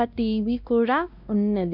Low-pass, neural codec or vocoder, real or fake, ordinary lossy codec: 5.4 kHz; codec, 16 kHz, 1 kbps, X-Codec, WavLM features, trained on Multilingual LibriSpeech; fake; none